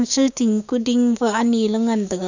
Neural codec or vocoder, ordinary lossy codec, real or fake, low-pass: codec, 16 kHz, 6 kbps, DAC; none; fake; 7.2 kHz